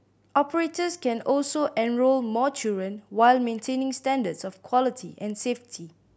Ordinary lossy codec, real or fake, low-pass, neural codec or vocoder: none; real; none; none